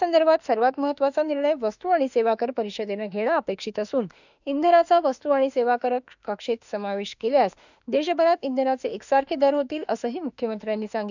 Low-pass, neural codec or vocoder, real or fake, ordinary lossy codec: 7.2 kHz; autoencoder, 48 kHz, 32 numbers a frame, DAC-VAE, trained on Japanese speech; fake; none